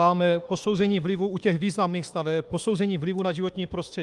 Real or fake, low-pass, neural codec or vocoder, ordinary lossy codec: fake; 10.8 kHz; autoencoder, 48 kHz, 32 numbers a frame, DAC-VAE, trained on Japanese speech; Opus, 32 kbps